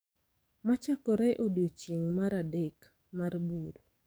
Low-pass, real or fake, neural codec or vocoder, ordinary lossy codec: none; fake; codec, 44.1 kHz, 7.8 kbps, DAC; none